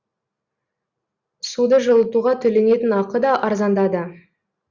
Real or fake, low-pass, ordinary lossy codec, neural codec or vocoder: real; 7.2 kHz; Opus, 64 kbps; none